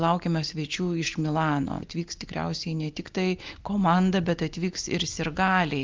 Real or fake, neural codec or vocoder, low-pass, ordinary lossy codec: real; none; 7.2 kHz; Opus, 32 kbps